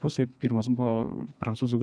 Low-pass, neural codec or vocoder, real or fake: 9.9 kHz; codec, 32 kHz, 1.9 kbps, SNAC; fake